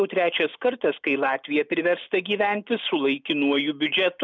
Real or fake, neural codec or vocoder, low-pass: real; none; 7.2 kHz